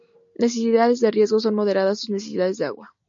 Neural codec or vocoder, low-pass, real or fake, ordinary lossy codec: none; 7.2 kHz; real; AAC, 64 kbps